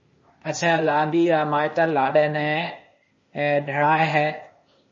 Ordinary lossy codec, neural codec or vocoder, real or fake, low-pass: MP3, 32 kbps; codec, 16 kHz, 0.8 kbps, ZipCodec; fake; 7.2 kHz